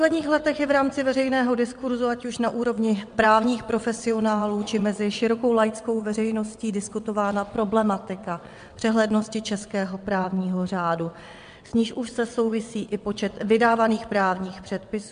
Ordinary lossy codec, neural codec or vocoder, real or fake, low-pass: MP3, 64 kbps; vocoder, 22.05 kHz, 80 mel bands, WaveNeXt; fake; 9.9 kHz